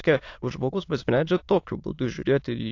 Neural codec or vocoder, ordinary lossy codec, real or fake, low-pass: autoencoder, 22.05 kHz, a latent of 192 numbers a frame, VITS, trained on many speakers; AAC, 48 kbps; fake; 7.2 kHz